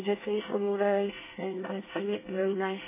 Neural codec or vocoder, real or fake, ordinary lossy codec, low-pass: codec, 24 kHz, 1 kbps, SNAC; fake; MP3, 16 kbps; 3.6 kHz